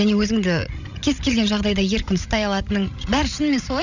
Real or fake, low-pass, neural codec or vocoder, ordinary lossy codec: fake; 7.2 kHz; codec, 16 kHz, 16 kbps, FreqCodec, larger model; none